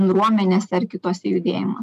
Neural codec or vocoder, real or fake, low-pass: none; real; 14.4 kHz